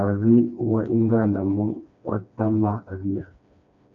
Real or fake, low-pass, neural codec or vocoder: fake; 7.2 kHz; codec, 16 kHz, 2 kbps, FreqCodec, smaller model